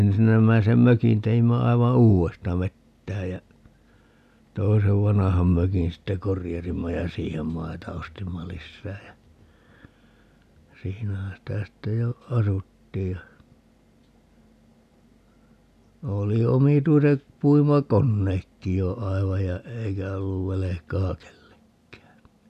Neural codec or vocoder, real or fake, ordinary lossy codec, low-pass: none; real; none; 14.4 kHz